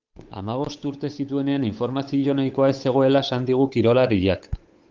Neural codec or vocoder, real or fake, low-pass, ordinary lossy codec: codec, 16 kHz, 8 kbps, FunCodec, trained on Chinese and English, 25 frames a second; fake; 7.2 kHz; Opus, 32 kbps